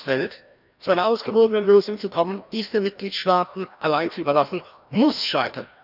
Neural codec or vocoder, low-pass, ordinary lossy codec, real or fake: codec, 16 kHz, 1 kbps, FreqCodec, larger model; 5.4 kHz; none; fake